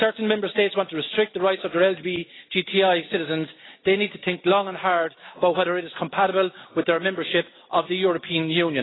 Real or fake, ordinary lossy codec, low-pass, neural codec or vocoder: real; AAC, 16 kbps; 7.2 kHz; none